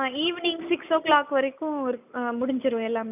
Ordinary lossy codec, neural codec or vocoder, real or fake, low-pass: none; none; real; 3.6 kHz